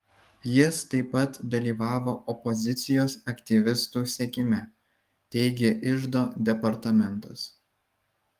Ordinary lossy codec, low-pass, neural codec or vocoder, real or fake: Opus, 32 kbps; 14.4 kHz; codec, 44.1 kHz, 7.8 kbps, DAC; fake